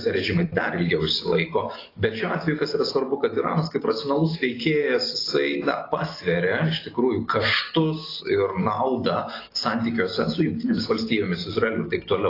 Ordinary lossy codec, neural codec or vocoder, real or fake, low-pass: AAC, 24 kbps; none; real; 5.4 kHz